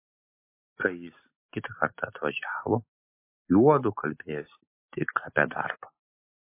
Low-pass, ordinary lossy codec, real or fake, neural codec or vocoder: 3.6 kHz; MP3, 24 kbps; real; none